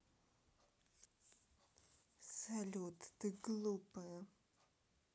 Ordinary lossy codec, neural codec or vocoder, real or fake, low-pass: none; none; real; none